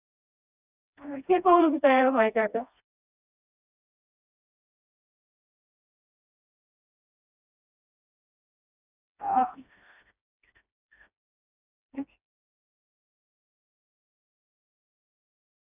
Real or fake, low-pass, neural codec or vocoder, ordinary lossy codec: fake; 3.6 kHz; codec, 16 kHz, 1 kbps, FreqCodec, smaller model; none